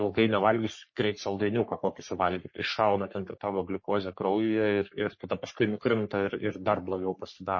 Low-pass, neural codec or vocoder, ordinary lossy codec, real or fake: 7.2 kHz; codec, 44.1 kHz, 3.4 kbps, Pupu-Codec; MP3, 32 kbps; fake